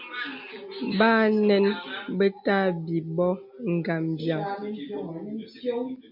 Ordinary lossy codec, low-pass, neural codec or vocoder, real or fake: MP3, 48 kbps; 5.4 kHz; none; real